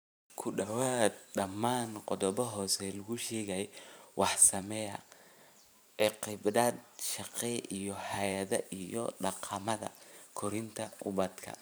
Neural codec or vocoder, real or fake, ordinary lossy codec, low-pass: vocoder, 44.1 kHz, 128 mel bands every 512 samples, BigVGAN v2; fake; none; none